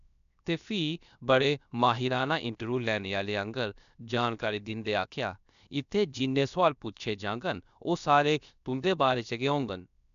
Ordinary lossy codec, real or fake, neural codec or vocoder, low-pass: none; fake; codec, 16 kHz, 0.7 kbps, FocalCodec; 7.2 kHz